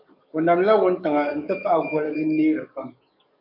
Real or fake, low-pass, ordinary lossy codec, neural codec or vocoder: fake; 5.4 kHz; MP3, 48 kbps; codec, 44.1 kHz, 7.8 kbps, DAC